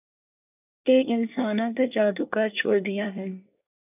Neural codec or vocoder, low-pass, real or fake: codec, 24 kHz, 1 kbps, SNAC; 3.6 kHz; fake